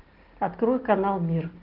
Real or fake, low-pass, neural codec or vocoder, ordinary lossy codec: real; 5.4 kHz; none; Opus, 16 kbps